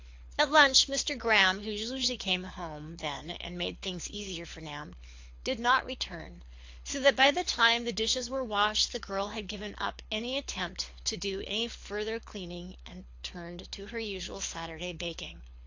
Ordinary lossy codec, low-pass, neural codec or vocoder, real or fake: AAC, 48 kbps; 7.2 kHz; codec, 24 kHz, 6 kbps, HILCodec; fake